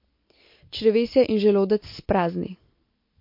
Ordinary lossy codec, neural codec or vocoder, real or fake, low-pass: MP3, 32 kbps; none; real; 5.4 kHz